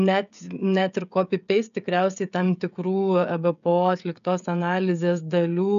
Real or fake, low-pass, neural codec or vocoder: fake; 7.2 kHz; codec, 16 kHz, 16 kbps, FreqCodec, smaller model